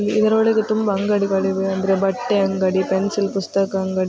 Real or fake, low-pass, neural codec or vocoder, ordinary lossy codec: real; none; none; none